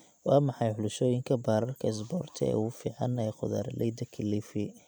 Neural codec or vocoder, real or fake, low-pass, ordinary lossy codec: none; real; none; none